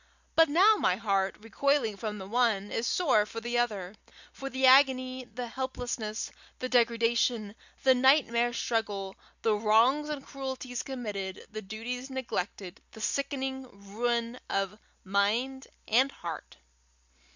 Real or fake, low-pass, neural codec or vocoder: real; 7.2 kHz; none